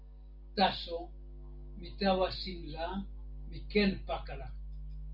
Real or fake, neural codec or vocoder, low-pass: real; none; 5.4 kHz